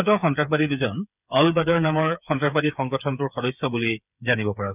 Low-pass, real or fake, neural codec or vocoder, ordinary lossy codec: 3.6 kHz; fake; codec, 16 kHz, 8 kbps, FreqCodec, smaller model; none